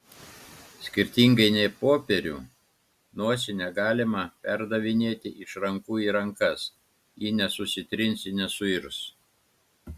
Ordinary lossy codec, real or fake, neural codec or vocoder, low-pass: Opus, 64 kbps; real; none; 14.4 kHz